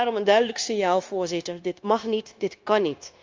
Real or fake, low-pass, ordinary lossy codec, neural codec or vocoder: fake; 7.2 kHz; Opus, 32 kbps; codec, 16 kHz, 0.9 kbps, LongCat-Audio-Codec